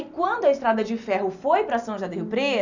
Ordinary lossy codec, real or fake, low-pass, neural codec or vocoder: none; real; 7.2 kHz; none